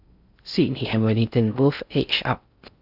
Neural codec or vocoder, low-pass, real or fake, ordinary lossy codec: codec, 16 kHz in and 24 kHz out, 0.6 kbps, FocalCodec, streaming, 4096 codes; 5.4 kHz; fake; Opus, 64 kbps